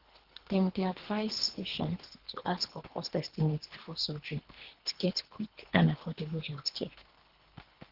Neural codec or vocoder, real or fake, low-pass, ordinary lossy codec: codec, 24 kHz, 3 kbps, HILCodec; fake; 5.4 kHz; Opus, 16 kbps